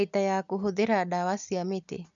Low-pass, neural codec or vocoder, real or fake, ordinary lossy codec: 7.2 kHz; none; real; none